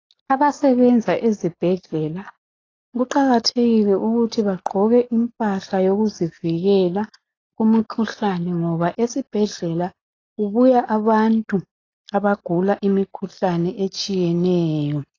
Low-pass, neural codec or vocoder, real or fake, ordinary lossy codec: 7.2 kHz; none; real; AAC, 32 kbps